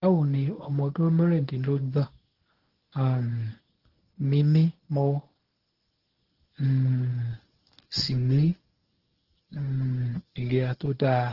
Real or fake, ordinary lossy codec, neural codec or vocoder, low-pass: fake; Opus, 16 kbps; codec, 24 kHz, 0.9 kbps, WavTokenizer, medium speech release version 1; 5.4 kHz